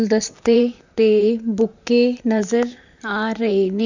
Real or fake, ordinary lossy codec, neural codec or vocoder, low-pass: fake; none; vocoder, 44.1 kHz, 128 mel bands, Pupu-Vocoder; 7.2 kHz